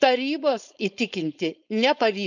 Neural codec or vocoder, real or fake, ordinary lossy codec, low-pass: codec, 16 kHz, 4.8 kbps, FACodec; fake; none; 7.2 kHz